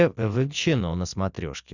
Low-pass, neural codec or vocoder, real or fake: 7.2 kHz; none; real